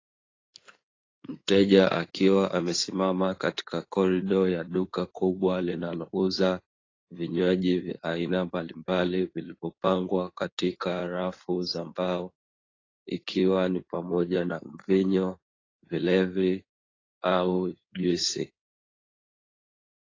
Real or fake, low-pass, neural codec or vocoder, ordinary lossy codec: fake; 7.2 kHz; codec, 16 kHz in and 24 kHz out, 2.2 kbps, FireRedTTS-2 codec; AAC, 32 kbps